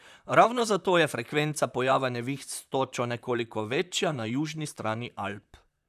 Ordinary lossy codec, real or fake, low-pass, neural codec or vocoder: none; fake; 14.4 kHz; vocoder, 44.1 kHz, 128 mel bands, Pupu-Vocoder